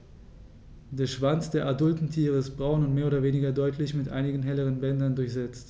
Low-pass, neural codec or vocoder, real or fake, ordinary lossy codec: none; none; real; none